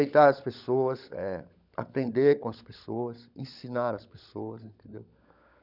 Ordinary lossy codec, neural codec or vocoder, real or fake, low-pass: none; codec, 16 kHz, 16 kbps, FunCodec, trained on LibriTTS, 50 frames a second; fake; 5.4 kHz